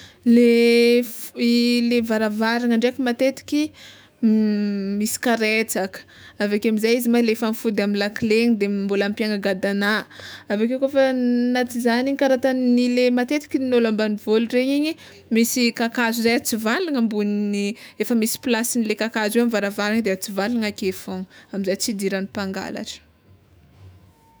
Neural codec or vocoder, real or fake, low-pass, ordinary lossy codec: autoencoder, 48 kHz, 128 numbers a frame, DAC-VAE, trained on Japanese speech; fake; none; none